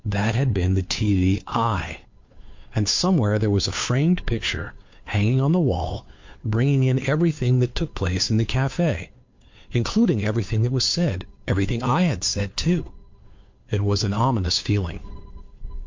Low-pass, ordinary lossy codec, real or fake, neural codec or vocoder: 7.2 kHz; MP3, 48 kbps; fake; codec, 16 kHz, 2 kbps, FunCodec, trained on Chinese and English, 25 frames a second